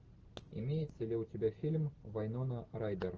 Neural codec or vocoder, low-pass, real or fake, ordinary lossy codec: none; 7.2 kHz; real; Opus, 16 kbps